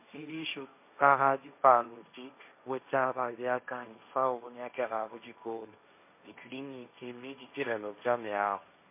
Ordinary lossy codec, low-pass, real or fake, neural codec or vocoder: MP3, 24 kbps; 3.6 kHz; fake; codec, 16 kHz, 1.1 kbps, Voila-Tokenizer